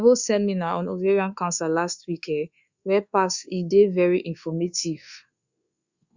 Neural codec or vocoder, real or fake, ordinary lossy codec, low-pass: codec, 24 kHz, 1.2 kbps, DualCodec; fake; Opus, 64 kbps; 7.2 kHz